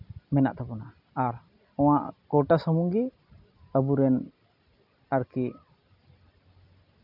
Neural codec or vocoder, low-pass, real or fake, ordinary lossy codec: none; 5.4 kHz; real; none